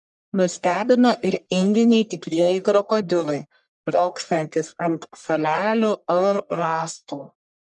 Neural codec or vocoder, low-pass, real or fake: codec, 44.1 kHz, 1.7 kbps, Pupu-Codec; 10.8 kHz; fake